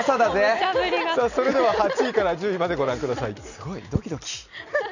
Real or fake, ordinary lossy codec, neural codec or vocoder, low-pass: real; none; none; 7.2 kHz